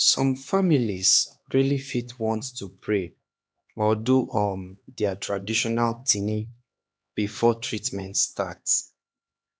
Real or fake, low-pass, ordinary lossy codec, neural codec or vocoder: fake; none; none; codec, 16 kHz, 2 kbps, X-Codec, HuBERT features, trained on LibriSpeech